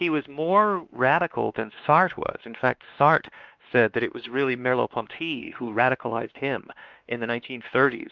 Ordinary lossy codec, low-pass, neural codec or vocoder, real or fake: Opus, 32 kbps; 7.2 kHz; codec, 16 kHz, 2 kbps, X-Codec, WavLM features, trained on Multilingual LibriSpeech; fake